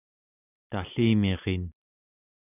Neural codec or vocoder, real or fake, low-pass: none; real; 3.6 kHz